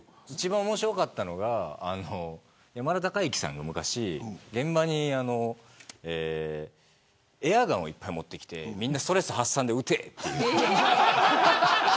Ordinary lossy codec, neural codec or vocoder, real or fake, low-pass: none; none; real; none